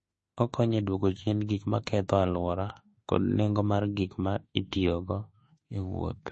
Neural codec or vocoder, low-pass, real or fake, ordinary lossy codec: autoencoder, 48 kHz, 32 numbers a frame, DAC-VAE, trained on Japanese speech; 10.8 kHz; fake; MP3, 32 kbps